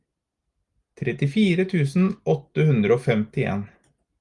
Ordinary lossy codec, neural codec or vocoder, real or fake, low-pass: Opus, 32 kbps; none; real; 10.8 kHz